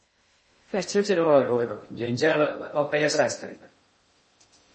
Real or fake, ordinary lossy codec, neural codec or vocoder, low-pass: fake; MP3, 32 kbps; codec, 16 kHz in and 24 kHz out, 0.6 kbps, FocalCodec, streaming, 2048 codes; 10.8 kHz